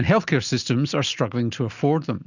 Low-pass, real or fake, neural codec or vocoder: 7.2 kHz; real; none